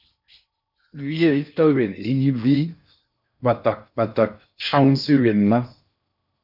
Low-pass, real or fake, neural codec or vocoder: 5.4 kHz; fake; codec, 16 kHz in and 24 kHz out, 0.6 kbps, FocalCodec, streaming, 4096 codes